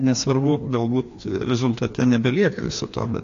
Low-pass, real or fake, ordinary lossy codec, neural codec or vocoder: 7.2 kHz; fake; AAC, 48 kbps; codec, 16 kHz, 2 kbps, FreqCodec, larger model